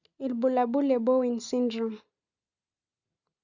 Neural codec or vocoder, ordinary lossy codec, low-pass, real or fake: none; Opus, 64 kbps; 7.2 kHz; real